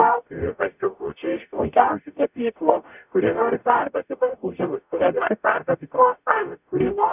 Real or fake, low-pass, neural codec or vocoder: fake; 3.6 kHz; codec, 44.1 kHz, 0.9 kbps, DAC